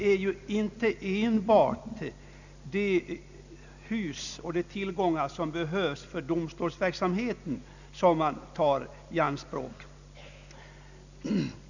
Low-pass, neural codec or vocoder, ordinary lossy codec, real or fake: 7.2 kHz; none; none; real